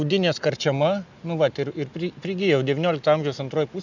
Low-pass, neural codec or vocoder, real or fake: 7.2 kHz; none; real